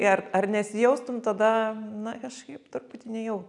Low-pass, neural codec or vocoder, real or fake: 10.8 kHz; none; real